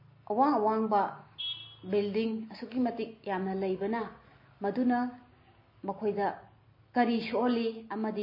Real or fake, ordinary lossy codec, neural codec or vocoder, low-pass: real; MP3, 24 kbps; none; 5.4 kHz